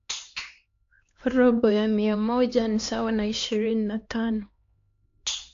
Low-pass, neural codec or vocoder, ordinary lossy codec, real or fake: 7.2 kHz; codec, 16 kHz, 2 kbps, X-Codec, HuBERT features, trained on LibriSpeech; AAC, 48 kbps; fake